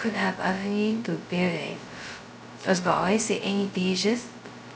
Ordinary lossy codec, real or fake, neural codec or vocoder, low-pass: none; fake; codec, 16 kHz, 0.2 kbps, FocalCodec; none